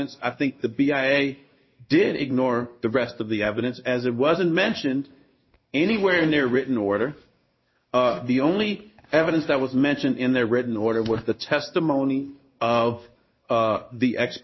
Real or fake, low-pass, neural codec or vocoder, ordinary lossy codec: fake; 7.2 kHz; codec, 16 kHz in and 24 kHz out, 1 kbps, XY-Tokenizer; MP3, 24 kbps